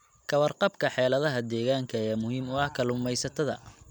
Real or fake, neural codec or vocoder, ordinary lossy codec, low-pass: real; none; none; 19.8 kHz